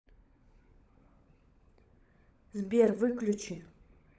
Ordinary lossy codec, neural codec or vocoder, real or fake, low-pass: none; codec, 16 kHz, 16 kbps, FunCodec, trained on LibriTTS, 50 frames a second; fake; none